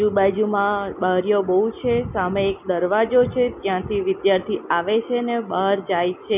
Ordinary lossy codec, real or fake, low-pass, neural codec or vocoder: none; real; 3.6 kHz; none